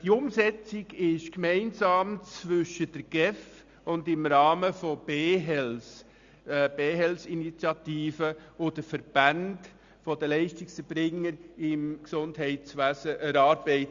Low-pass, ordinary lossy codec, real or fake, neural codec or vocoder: 7.2 kHz; Opus, 64 kbps; real; none